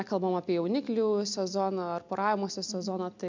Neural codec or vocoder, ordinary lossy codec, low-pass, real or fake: none; MP3, 64 kbps; 7.2 kHz; real